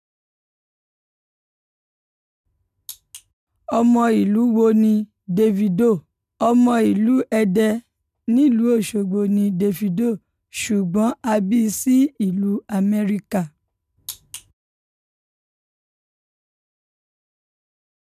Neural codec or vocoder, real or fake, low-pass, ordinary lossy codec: none; real; 14.4 kHz; none